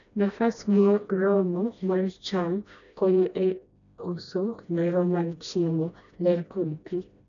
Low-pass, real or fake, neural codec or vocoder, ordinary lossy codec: 7.2 kHz; fake; codec, 16 kHz, 1 kbps, FreqCodec, smaller model; none